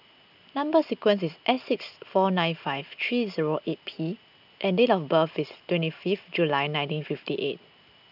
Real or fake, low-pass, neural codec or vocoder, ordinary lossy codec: real; 5.4 kHz; none; none